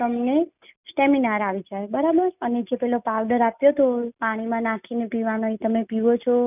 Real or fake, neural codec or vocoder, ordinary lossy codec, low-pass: real; none; none; 3.6 kHz